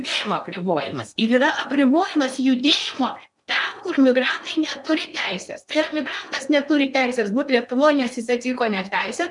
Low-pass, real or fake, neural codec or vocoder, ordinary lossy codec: 10.8 kHz; fake; codec, 16 kHz in and 24 kHz out, 0.8 kbps, FocalCodec, streaming, 65536 codes; MP3, 96 kbps